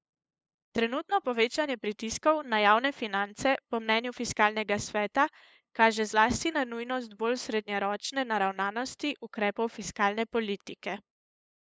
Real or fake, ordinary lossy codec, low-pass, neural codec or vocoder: fake; none; none; codec, 16 kHz, 8 kbps, FunCodec, trained on LibriTTS, 25 frames a second